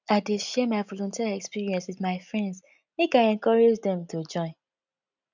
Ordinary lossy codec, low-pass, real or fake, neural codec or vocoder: none; 7.2 kHz; real; none